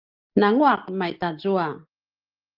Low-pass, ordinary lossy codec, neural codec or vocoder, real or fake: 5.4 kHz; Opus, 32 kbps; none; real